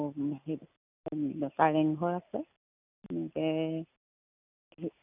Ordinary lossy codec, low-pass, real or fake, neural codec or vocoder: none; 3.6 kHz; real; none